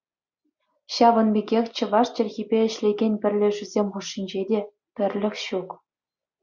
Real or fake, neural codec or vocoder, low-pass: real; none; 7.2 kHz